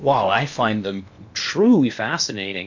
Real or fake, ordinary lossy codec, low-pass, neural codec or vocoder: fake; MP3, 64 kbps; 7.2 kHz; codec, 16 kHz in and 24 kHz out, 0.8 kbps, FocalCodec, streaming, 65536 codes